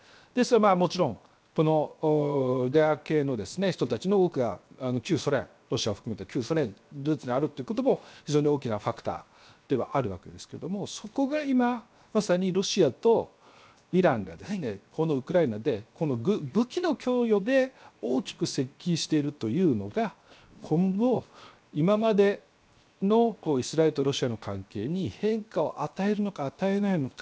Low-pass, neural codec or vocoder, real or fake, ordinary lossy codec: none; codec, 16 kHz, 0.7 kbps, FocalCodec; fake; none